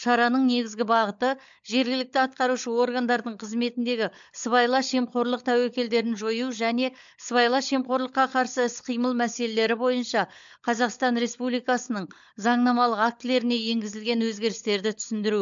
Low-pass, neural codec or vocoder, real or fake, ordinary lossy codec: 7.2 kHz; codec, 16 kHz, 16 kbps, FunCodec, trained on LibriTTS, 50 frames a second; fake; MP3, 64 kbps